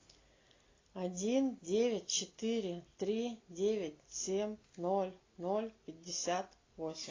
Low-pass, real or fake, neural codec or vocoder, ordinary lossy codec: 7.2 kHz; real; none; AAC, 32 kbps